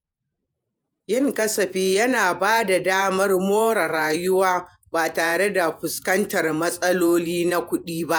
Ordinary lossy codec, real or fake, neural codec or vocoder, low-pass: none; fake; vocoder, 48 kHz, 128 mel bands, Vocos; none